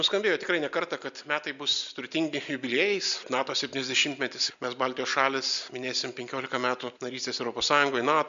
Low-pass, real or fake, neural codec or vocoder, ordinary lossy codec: 7.2 kHz; real; none; MP3, 64 kbps